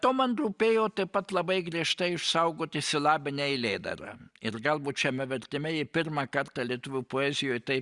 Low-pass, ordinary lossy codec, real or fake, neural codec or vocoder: 10.8 kHz; Opus, 64 kbps; real; none